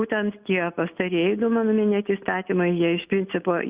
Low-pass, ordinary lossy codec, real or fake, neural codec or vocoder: 3.6 kHz; Opus, 64 kbps; real; none